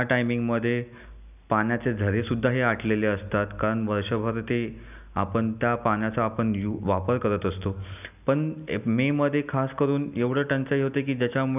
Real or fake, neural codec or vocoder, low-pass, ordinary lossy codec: real; none; 3.6 kHz; none